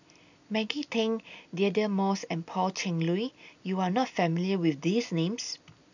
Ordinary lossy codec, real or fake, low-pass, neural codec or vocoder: none; real; 7.2 kHz; none